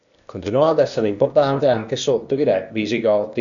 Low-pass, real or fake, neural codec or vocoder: 7.2 kHz; fake; codec, 16 kHz, 0.8 kbps, ZipCodec